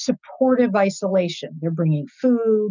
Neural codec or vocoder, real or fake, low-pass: none; real; 7.2 kHz